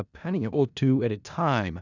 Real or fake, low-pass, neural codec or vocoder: fake; 7.2 kHz; codec, 16 kHz in and 24 kHz out, 0.4 kbps, LongCat-Audio-Codec, four codebook decoder